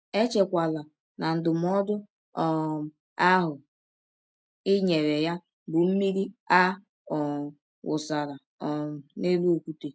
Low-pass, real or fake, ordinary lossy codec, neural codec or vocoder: none; real; none; none